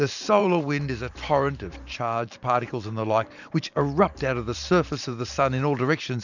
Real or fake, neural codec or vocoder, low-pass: fake; vocoder, 44.1 kHz, 128 mel bands every 512 samples, BigVGAN v2; 7.2 kHz